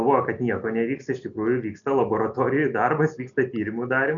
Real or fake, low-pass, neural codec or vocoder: real; 7.2 kHz; none